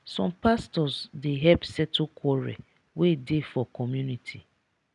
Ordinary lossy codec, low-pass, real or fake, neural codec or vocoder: none; 10.8 kHz; real; none